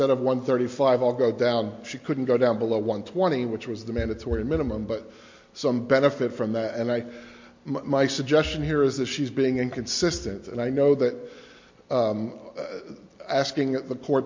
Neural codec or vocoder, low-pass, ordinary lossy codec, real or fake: none; 7.2 kHz; MP3, 48 kbps; real